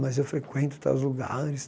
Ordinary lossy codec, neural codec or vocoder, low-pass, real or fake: none; none; none; real